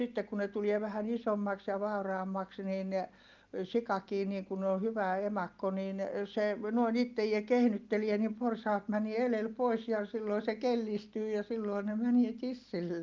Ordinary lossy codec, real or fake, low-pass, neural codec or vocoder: Opus, 32 kbps; real; 7.2 kHz; none